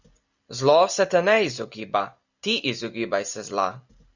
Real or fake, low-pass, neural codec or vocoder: real; 7.2 kHz; none